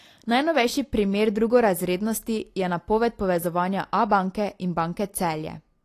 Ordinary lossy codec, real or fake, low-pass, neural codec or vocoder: AAC, 48 kbps; real; 14.4 kHz; none